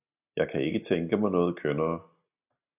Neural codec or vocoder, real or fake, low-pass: none; real; 3.6 kHz